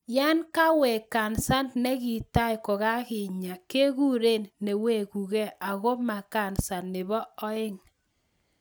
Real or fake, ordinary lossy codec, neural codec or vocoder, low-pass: real; none; none; none